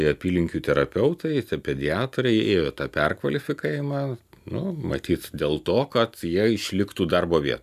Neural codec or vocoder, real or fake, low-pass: none; real; 14.4 kHz